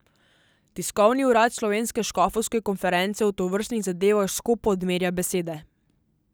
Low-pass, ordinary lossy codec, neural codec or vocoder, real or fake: none; none; none; real